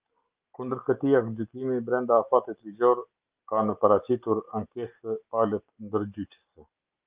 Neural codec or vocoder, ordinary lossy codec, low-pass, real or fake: none; Opus, 32 kbps; 3.6 kHz; real